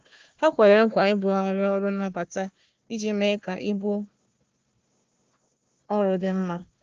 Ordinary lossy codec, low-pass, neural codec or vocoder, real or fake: Opus, 24 kbps; 7.2 kHz; codec, 16 kHz, 1 kbps, FunCodec, trained on Chinese and English, 50 frames a second; fake